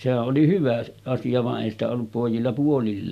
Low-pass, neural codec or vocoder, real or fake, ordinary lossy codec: 14.4 kHz; none; real; none